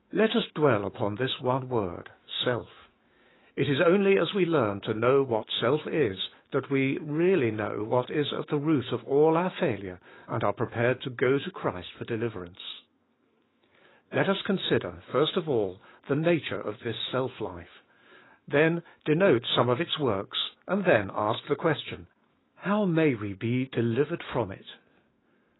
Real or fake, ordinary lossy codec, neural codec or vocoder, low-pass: fake; AAC, 16 kbps; autoencoder, 48 kHz, 128 numbers a frame, DAC-VAE, trained on Japanese speech; 7.2 kHz